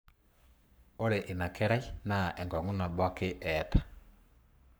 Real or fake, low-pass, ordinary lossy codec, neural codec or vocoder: fake; none; none; codec, 44.1 kHz, 7.8 kbps, Pupu-Codec